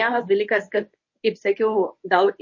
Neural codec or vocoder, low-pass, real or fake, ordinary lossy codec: codec, 24 kHz, 0.9 kbps, WavTokenizer, medium speech release version 1; 7.2 kHz; fake; MP3, 32 kbps